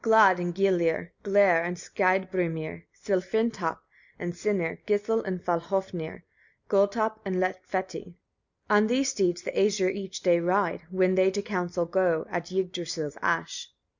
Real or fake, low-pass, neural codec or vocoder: real; 7.2 kHz; none